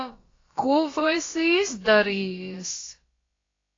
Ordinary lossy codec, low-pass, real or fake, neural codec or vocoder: AAC, 32 kbps; 7.2 kHz; fake; codec, 16 kHz, about 1 kbps, DyCAST, with the encoder's durations